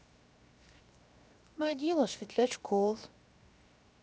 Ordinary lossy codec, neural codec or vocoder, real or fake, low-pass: none; codec, 16 kHz, 0.7 kbps, FocalCodec; fake; none